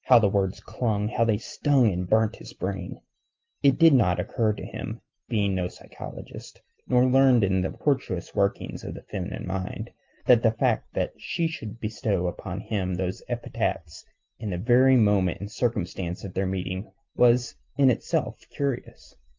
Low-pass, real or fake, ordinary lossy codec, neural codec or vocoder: 7.2 kHz; real; Opus, 32 kbps; none